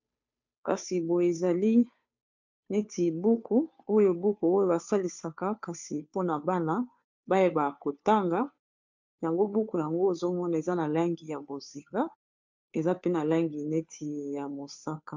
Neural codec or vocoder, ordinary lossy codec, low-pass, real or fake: codec, 16 kHz, 2 kbps, FunCodec, trained on Chinese and English, 25 frames a second; MP3, 64 kbps; 7.2 kHz; fake